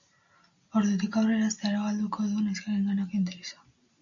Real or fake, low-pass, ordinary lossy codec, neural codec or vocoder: real; 7.2 kHz; MP3, 96 kbps; none